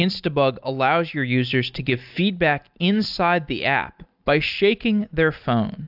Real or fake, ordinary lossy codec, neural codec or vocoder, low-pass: real; AAC, 48 kbps; none; 5.4 kHz